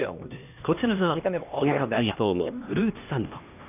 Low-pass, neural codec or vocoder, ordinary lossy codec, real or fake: 3.6 kHz; codec, 16 kHz, 1 kbps, X-Codec, HuBERT features, trained on LibriSpeech; none; fake